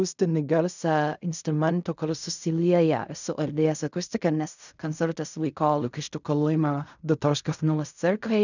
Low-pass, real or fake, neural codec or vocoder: 7.2 kHz; fake; codec, 16 kHz in and 24 kHz out, 0.4 kbps, LongCat-Audio-Codec, fine tuned four codebook decoder